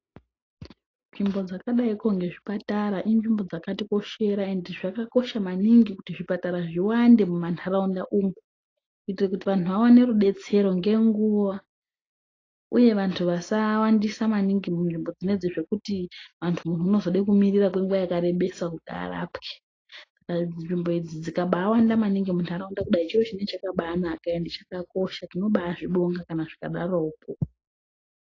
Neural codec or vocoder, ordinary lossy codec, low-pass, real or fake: none; AAC, 32 kbps; 7.2 kHz; real